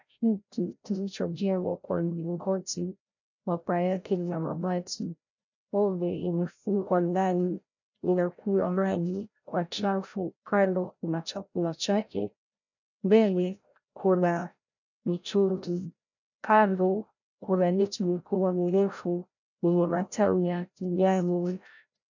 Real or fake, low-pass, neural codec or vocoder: fake; 7.2 kHz; codec, 16 kHz, 0.5 kbps, FreqCodec, larger model